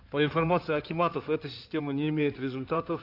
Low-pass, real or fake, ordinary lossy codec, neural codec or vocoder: 5.4 kHz; fake; none; codec, 16 kHz in and 24 kHz out, 2.2 kbps, FireRedTTS-2 codec